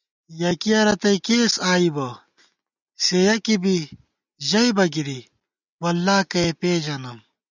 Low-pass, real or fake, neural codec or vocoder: 7.2 kHz; real; none